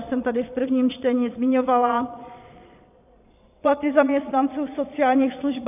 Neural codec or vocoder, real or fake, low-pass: vocoder, 22.05 kHz, 80 mel bands, Vocos; fake; 3.6 kHz